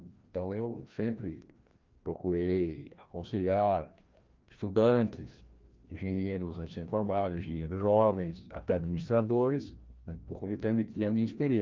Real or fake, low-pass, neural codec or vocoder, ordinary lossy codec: fake; 7.2 kHz; codec, 16 kHz, 1 kbps, FreqCodec, larger model; Opus, 32 kbps